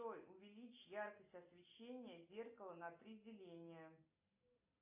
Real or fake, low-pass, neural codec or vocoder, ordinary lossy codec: real; 3.6 kHz; none; AAC, 32 kbps